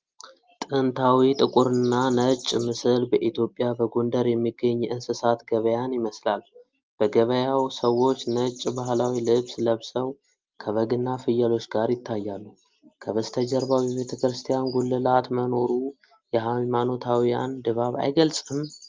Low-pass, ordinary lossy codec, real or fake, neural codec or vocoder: 7.2 kHz; Opus, 24 kbps; real; none